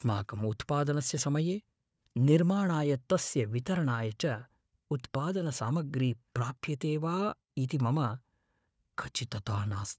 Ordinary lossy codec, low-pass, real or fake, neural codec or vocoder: none; none; fake; codec, 16 kHz, 4 kbps, FunCodec, trained on Chinese and English, 50 frames a second